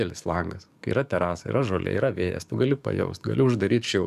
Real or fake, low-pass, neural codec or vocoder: fake; 14.4 kHz; codec, 44.1 kHz, 7.8 kbps, DAC